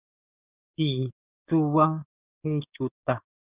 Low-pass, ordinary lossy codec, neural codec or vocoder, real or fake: 3.6 kHz; Opus, 64 kbps; codec, 16 kHz, 6 kbps, DAC; fake